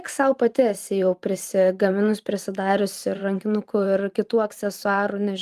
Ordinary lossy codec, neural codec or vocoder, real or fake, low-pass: Opus, 32 kbps; vocoder, 44.1 kHz, 128 mel bands every 512 samples, BigVGAN v2; fake; 14.4 kHz